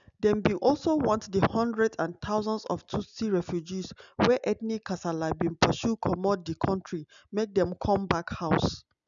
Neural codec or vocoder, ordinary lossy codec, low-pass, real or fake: none; none; 7.2 kHz; real